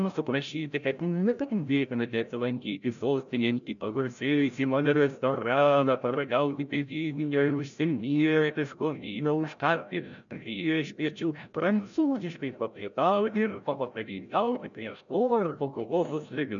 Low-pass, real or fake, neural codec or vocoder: 7.2 kHz; fake; codec, 16 kHz, 0.5 kbps, FreqCodec, larger model